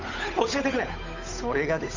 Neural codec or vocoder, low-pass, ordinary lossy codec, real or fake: codec, 16 kHz, 8 kbps, FunCodec, trained on Chinese and English, 25 frames a second; 7.2 kHz; none; fake